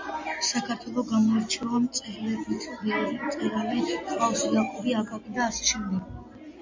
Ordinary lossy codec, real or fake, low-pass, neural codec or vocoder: MP3, 48 kbps; real; 7.2 kHz; none